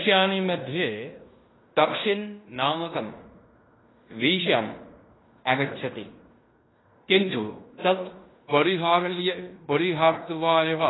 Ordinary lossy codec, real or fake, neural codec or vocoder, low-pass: AAC, 16 kbps; fake; codec, 16 kHz in and 24 kHz out, 0.9 kbps, LongCat-Audio-Codec, fine tuned four codebook decoder; 7.2 kHz